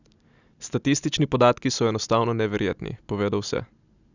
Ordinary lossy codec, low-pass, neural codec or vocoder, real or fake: none; 7.2 kHz; none; real